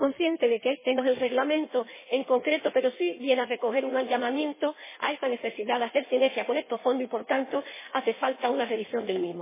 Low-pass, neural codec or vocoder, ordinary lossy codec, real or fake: 3.6 kHz; codec, 16 kHz in and 24 kHz out, 1.1 kbps, FireRedTTS-2 codec; MP3, 16 kbps; fake